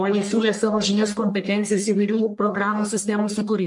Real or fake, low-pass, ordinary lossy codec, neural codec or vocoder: fake; 10.8 kHz; MP3, 64 kbps; codec, 44.1 kHz, 1.7 kbps, Pupu-Codec